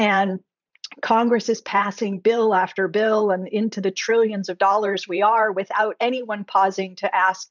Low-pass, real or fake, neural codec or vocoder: 7.2 kHz; fake; vocoder, 44.1 kHz, 128 mel bands every 512 samples, BigVGAN v2